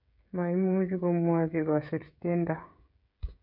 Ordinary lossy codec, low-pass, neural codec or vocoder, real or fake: none; 5.4 kHz; codec, 16 kHz, 16 kbps, FreqCodec, smaller model; fake